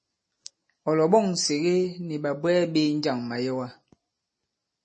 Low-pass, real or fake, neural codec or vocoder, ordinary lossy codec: 9.9 kHz; real; none; MP3, 32 kbps